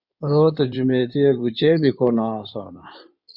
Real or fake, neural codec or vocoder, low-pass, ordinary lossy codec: fake; codec, 16 kHz in and 24 kHz out, 2.2 kbps, FireRedTTS-2 codec; 5.4 kHz; Opus, 64 kbps